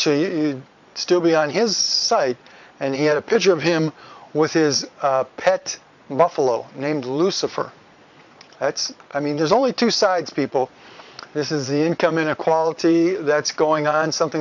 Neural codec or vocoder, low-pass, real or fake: vocoder, 22.05 kHz, 80 mel bands, Vocos; 7.2 kHz; fake